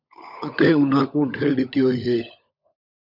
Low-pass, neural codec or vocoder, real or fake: 5.4 kHz; codec, 16 kHz, 16 kbps, FunCodec, trained on LibriTTS, 50 frames a second; fake